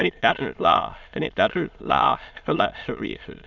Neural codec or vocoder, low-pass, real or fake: autoencoder, 22.05 kHz, a latent of 192 numbers a frame, VITS, trained on many speakers; 7.2 kHz; fake